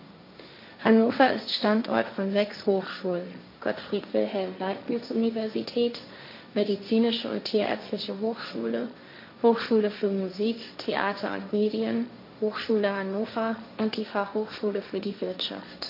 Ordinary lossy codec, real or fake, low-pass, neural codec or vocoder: MP3, 32 kbps; fake; 5.4 kHz; codec, 16 kHz, 1.1 kbps, Voila-Tokenizer